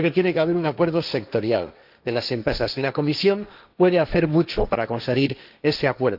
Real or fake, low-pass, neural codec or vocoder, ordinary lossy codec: fake; 5.4 kHz; codec, 16 kHz, 1.1 kbps, Voila-Tokenizer; none